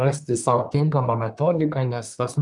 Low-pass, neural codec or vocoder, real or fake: 10.8 kHz; codec, 24 kHz, 1 kbps, SNAC; fake